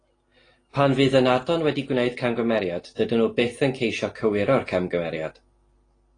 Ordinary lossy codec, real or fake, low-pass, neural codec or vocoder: AAC, 32 kbps; real; 9.9 kHz; none